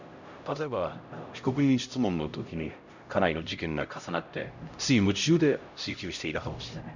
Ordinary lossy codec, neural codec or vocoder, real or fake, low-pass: none; codec, 16 kHz, 0.5 kbps, X-Codec, HuBERT features, trained on LibriSpeech; fake; 7.2 kHz